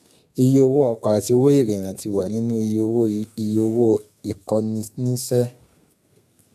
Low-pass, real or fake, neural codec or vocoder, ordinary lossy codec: 14.4 kHz; fake; codec, 32 kHz, 1.9 kbps, SNAC; none